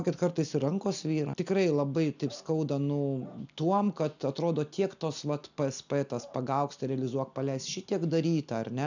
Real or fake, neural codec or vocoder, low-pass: real; none; 7.2 kHz